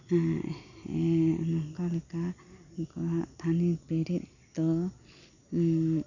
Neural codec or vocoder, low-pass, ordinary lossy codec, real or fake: none; 7.2 kHz; none; real